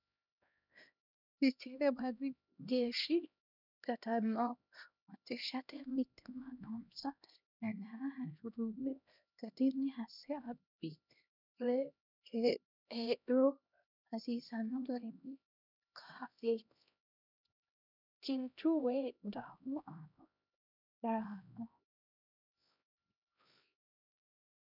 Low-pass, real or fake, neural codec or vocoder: 5.4 kHz; fake; codec, 16 kHz, 1 kbps, X-Codec, HuBERT features, trained on LibriSpeech